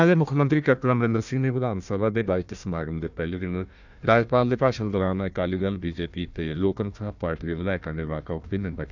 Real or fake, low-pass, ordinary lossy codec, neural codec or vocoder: fake; 7.2 kHz; none; codec, 16 kHz, 1 kbps, FunCodec, trained on Chinese and English, 50 frames a second